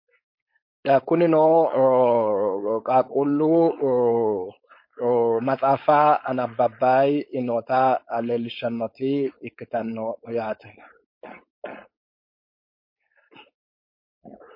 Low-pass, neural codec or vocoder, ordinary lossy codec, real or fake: 5.4 kHz; codec, 16 kHz, 4.8 kbps, FACodec; MP3, 32 kbps; fake